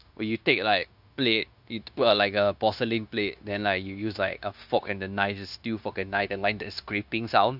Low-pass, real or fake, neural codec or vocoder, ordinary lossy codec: 5.4 kHz; fake; codec, 16 kHz, 0.9 kbps, LongCat-Audio-Codec; none